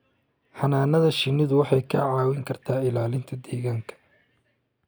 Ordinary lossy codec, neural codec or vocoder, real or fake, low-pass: none; none; real; none